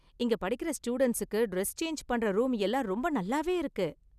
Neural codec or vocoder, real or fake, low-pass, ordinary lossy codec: none; real; 14.4 kHz; none